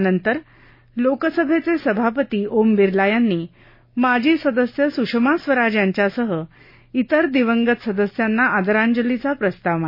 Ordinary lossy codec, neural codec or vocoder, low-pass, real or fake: MP3, 24 kbps; none; 5.4 kHz; real